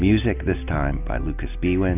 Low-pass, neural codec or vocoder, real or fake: 3.6 kHz; none; real